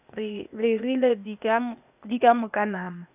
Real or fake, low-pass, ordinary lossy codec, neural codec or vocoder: fake; 3.6 kHz; none; codec, 16 kHz, 0.8 kbps, ZipCodec